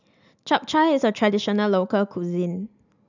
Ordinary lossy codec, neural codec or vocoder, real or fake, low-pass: none; none; real; 7.2 kHz